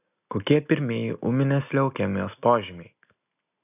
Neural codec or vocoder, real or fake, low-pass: none; real; 3.6 kHz